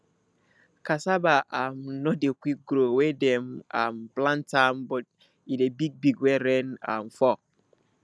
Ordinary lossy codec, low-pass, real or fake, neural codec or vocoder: none; none; real; none